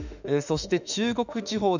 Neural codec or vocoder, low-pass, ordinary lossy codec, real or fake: autoencoder, 48 kHz, 32 numbers a frame, DAC-VAE, trained on Japanese speech; 7.2 kHz; none; fake